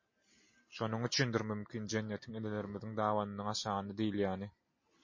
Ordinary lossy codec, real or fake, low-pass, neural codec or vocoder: MP3, 32 kbps; real; 7.2 kHz; none